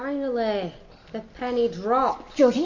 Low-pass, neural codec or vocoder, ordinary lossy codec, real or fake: 7.2 kHz; none; MP3, 48 kbps; real